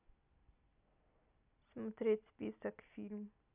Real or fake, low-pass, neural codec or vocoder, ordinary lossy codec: real; 3.6 kHz; none; none